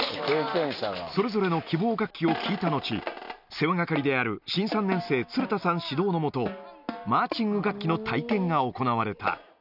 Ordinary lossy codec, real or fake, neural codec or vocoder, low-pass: none; real; none; 5.4 kHz